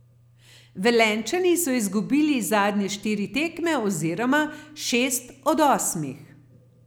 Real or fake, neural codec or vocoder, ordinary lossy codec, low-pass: real; none; none; none